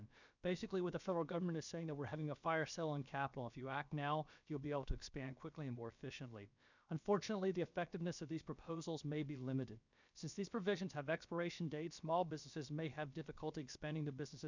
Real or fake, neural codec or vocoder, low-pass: fake; codec, 16 kHz, about 1 kbps, DyCAST, with the encoder's durations; 7.2 kHz